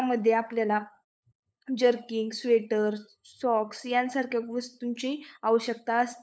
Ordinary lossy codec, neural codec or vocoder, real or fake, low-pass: none; codec, 16 kHz, 8 kbps, FunCodec, trained on LibriTTS, 25 frames a second; fake; none